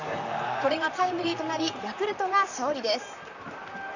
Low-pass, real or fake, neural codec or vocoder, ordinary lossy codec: 7.2 kHz; fake; vocoder, 44.1 kHz, 128 mel bands, Pupu-Vocoder; none